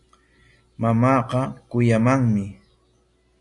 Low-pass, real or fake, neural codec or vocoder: 10.8 kHz; real; none